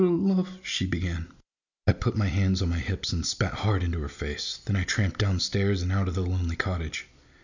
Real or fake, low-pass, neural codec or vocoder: real; 7.2 kHz; none